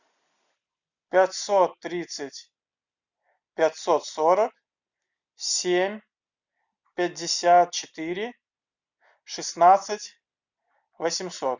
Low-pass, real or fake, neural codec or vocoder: 7.2 kHz; real; none